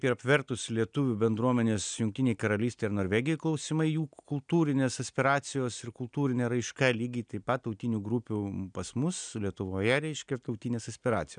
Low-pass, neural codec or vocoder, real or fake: 9.9 kHz; none; real